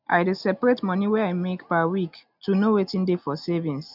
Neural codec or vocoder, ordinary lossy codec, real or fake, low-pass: none; none; real; 5.4 kHz